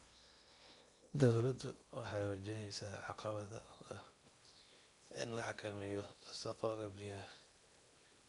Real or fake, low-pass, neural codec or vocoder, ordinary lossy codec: fake; 10.8 kHz; codec, 16 kHz in and 24 kHz out, 0.8 kbps, FocalCodec, streaming, 65536 codes; none